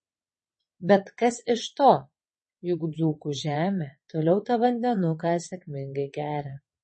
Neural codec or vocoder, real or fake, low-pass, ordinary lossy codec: vocoder, 22.05 kHz, 80 mel bands, WaveNeXt; fake; 9.9 kHz; MP3, 32 kbps